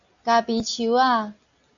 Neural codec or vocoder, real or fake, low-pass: none; real; 7.2 kHz